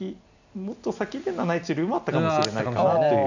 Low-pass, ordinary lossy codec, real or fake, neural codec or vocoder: 7.2 kHz; none; real; none